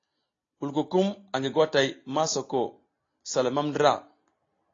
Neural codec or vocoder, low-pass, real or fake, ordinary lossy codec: none; 7.2 kHz; real; AAC, 32 kbps